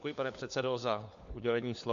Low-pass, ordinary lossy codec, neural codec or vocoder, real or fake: 7.2 kHz; AAC, 64 kbps; codec, 16 kHz, 4 kbps, FunCodec, trained on LibriTTS, 50 frames a second; fake